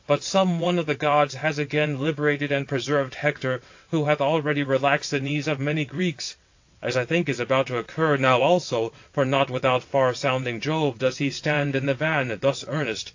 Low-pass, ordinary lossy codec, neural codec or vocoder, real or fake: 7.2 kHz; AAC, 48 kbps; vocoder, 22.05 kHz, 80 mel bands, WaveNeXt; fake